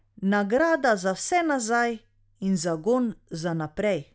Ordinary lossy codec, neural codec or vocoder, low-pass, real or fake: none; none; none; real